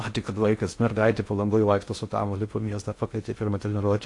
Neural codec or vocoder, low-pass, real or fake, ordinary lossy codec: codec, 16 kHz in and 24 kHz out, 0.6 kbps, FocalCodec, streaming, 2048 codes; 10.8 kHz; fake; AAC, 48 kbps